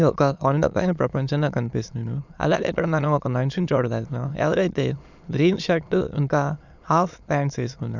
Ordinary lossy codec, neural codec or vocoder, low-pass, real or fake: none; autoencoder, 22.05 kHz, a latent of 192 numbers a frame, VITS, trained on many speakers; 7.2 kHz; fake